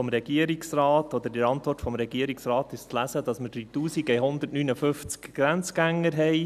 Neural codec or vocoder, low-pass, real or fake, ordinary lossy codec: none; 14.4 kHz; real; none